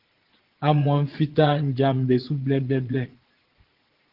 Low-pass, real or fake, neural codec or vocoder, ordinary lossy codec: 5.4 kHz; fake; vocoder, 22.05 kHz, 80 mel bands, Vocos; Opus, 16 kbps